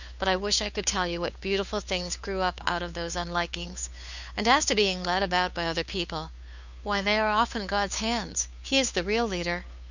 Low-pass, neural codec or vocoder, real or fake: 7.2 kHz; codec, 16 kHz, 2 kbps, FunCodec, trained on Chinese and English, 25 frames a second; fake